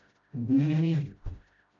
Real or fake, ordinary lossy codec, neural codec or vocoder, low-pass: fake; AAC, 48 kbps; codec, 16 kHz, 0.5 kbps, FreqCodec, smaller model; 7.2 kHz